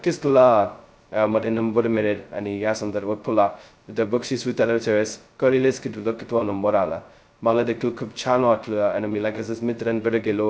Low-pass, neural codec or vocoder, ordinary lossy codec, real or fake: none; codec, 16 kHz, 0.2 kbps, FocalCodec; none; fake